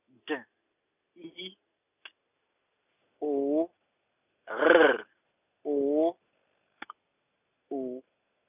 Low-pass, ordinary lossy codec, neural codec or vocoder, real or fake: 3.6 kHz; none; none; real